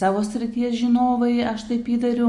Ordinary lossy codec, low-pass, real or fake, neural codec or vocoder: MP3, 48 kbps; 10.8 kHz; real; none